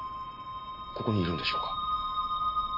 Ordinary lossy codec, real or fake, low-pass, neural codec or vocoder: MP3, 24 kbps; real; 5.4 kHz; none